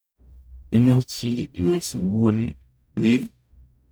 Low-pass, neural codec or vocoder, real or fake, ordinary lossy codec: none; codec, 44.1 kHz, 0.9 kbps, DAC; fake; none